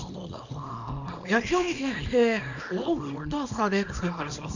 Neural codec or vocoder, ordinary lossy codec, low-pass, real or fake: codec, 24 kHz, 0.9 kbps, WavTokenizer, small release; none; 7.2 kHz; fake